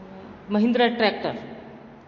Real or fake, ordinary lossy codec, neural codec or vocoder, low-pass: real; MP3, 32 kbps; none; 7.2 kHz